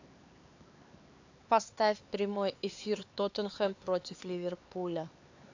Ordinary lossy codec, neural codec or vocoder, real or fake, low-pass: AAC, 48 kbps; codec, 16 kHz, 4 kbps, X-Codec, HuBERT features, trained on LibriSpeech; fake; 7.2 kHz